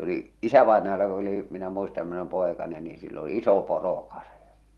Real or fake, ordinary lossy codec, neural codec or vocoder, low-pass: fake; Opus, 24 kbps; vocoder, 44.1 kHz, 128 mel bands every 256 samples, BigVGAN v2; 19.8 kHz